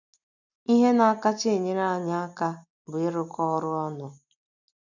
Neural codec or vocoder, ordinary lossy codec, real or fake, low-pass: none; none; real; 7.2 kHz